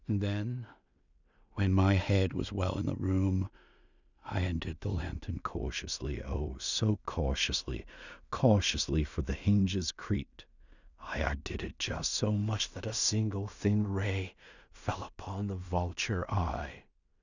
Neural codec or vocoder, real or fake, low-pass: codec, 16 kHz in and 24 kHz out, 0.4 kbps, LongCat-Audio-Codec, two codebook decoder; fake; 7.2 kHz